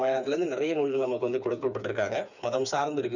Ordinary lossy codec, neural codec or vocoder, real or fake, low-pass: none; codec, 16 kHz, 4 kbps, FreqCodec, smaller model; fake; 7.2 kHz